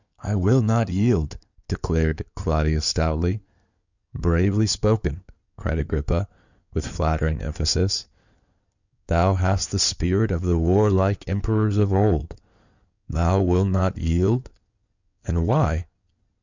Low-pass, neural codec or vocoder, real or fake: 7.2 kHz; codec, 16 kHz in and 24 kHz out, 2.2 kbps, FireRedTTS-2 codec; fake